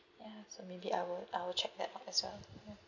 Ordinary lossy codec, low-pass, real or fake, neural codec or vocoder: none; 7.2 kHz; real; none